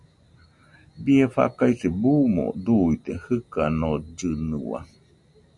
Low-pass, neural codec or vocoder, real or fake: 10.8 kHz; none; real